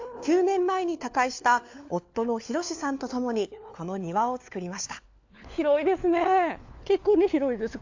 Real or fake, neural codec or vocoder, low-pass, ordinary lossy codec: fake; codec, 16 kHz, 4 kbps, FunCodec, trained on LibriTTS, 50 frames a second; 7.2 kHz; AAC, 48 kbps